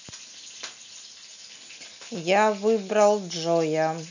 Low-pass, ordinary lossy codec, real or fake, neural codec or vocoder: 7.2 kHz; none; real; none